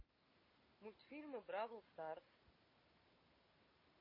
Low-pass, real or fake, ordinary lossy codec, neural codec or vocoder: 5.4 kHz; real; MP3, 24 kbps; none